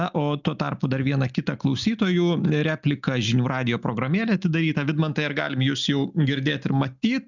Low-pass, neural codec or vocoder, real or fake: 7.2 kHz; none; real